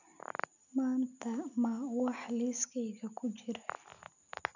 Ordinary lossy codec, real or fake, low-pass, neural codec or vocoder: none; real; 7.2 kHz; none